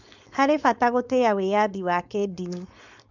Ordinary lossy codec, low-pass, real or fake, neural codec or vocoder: none; 7.2 kHz; fake; codec, 16 kHz, 4.8 kbps, FACodec